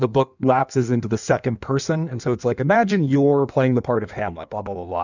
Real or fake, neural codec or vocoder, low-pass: fake; codec, 16 kHz in and 24 kHz out, 1.1 kbps, FireRedTTS-2 codec; 7.2 kHz